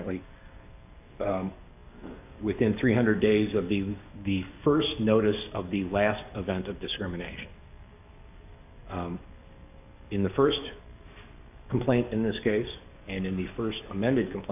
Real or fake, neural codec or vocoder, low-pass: fake; codec, 44.1 kHz, 7.8 kbps, DAC; 3.6 kHz